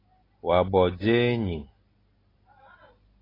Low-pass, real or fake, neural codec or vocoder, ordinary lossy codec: 5.4 kHz; real; none; AAC, 24 kbps